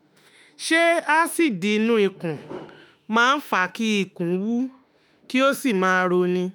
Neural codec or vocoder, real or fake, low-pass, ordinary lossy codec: autoencoder, 48 kHz, 32 numbers a frame, DAC-VAE, trained on Japanese speech; fake; none; none